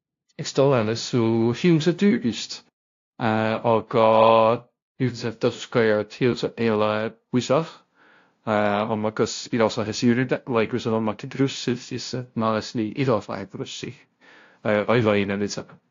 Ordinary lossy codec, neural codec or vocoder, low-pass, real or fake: AAC, 48 kbps; codec, 16 kHz, 0.5 kbps, FunCodec, trained on LibriTTS, 25 frames a second; 7.2 kHz; fake